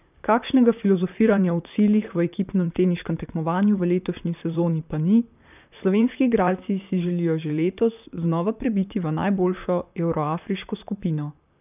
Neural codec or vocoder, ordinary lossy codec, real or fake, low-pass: vocoder, 44.1 kHz, 128 mel bands, Pupu-Vocoder; none; fake; 3.6 kHz